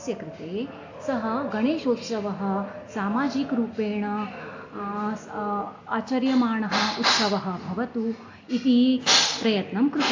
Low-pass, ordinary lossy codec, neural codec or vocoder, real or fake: 7.2 kHz; AAC, 48 kbps; none; real